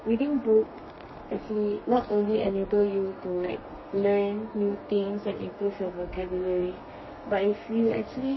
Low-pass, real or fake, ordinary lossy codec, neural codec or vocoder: 7.2 kHz; fake; MP3, 24 kbps; codec, 32 kHz, 1.9 kbps, SNAC